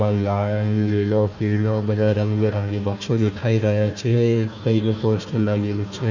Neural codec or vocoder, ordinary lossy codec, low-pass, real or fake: codec, 16 kHz, 1 kbps, FunCodec, trained on Chinese and English, 50 frames a second; none; 7.2 kHz; fake